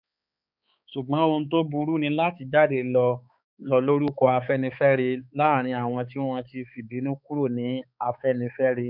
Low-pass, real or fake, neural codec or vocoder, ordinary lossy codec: 5.4 kHz; fake; codec, 16 kHz, 4 kbps, X-Codec, HuBERT features, trained on balanced general audio; none